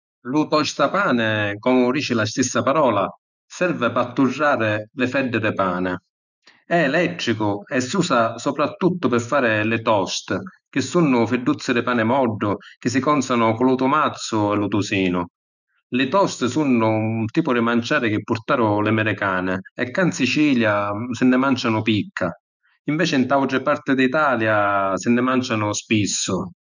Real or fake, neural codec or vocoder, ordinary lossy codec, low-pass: fake; autoencoder, 48 kHz, 128 numbers a frame, DAC-VAE, trained on Japanese speech; none; 7.2 kHz